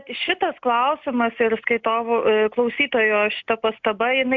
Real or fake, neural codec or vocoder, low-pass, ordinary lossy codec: real; none; 7.2 kHz; MP3, 64 kbps